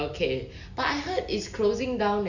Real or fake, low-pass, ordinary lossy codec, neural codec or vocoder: real; 7.2 kHz; none; none